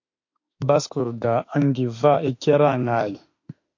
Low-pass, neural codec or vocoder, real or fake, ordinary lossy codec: 7.2 kHz; autoencoder, 48 kHz, 32 numbers a frame, DAC-VAE, trained on Japanese speech; fake; MP3, 48 kbps